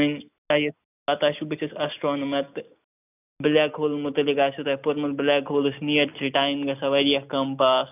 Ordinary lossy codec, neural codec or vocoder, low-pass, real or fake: none; none; 3.6 kHz; real